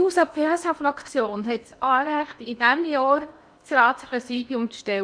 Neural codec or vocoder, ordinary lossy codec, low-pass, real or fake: codec, 16 kHz in and 24 kHz out, 0.8 kbps, FocalCodec, streaming, 65536 codes; none; 9.9 kHz; fake